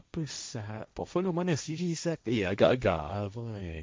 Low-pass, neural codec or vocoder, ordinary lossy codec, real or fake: none; codec, 16 kHz, 1.1 kbps, Voila-Tokenizer; none; fake